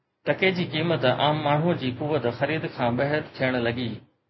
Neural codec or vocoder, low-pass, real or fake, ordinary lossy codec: none; 7.2 kHz; real; MP3, 24 kbps